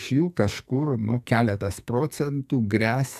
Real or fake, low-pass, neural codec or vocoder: fake; 14.4 kHz; codec, 44.1 kHz, 2.6 kbps, SNAC